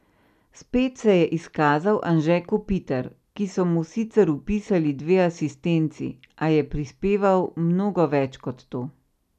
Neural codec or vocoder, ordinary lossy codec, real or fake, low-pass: none; none; real; 14.4 kHz